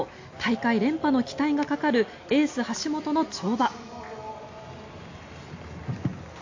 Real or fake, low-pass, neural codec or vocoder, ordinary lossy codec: real; 7.2 kHz; none; AAC, 48 kbps